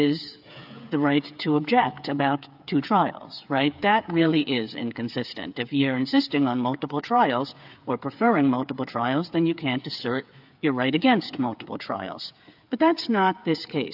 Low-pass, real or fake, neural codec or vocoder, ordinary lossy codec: 5.4 kHz; fake; codec, 16 kHz, 8 kbps, FreqCodec, smaller model; AAC, 48 kbps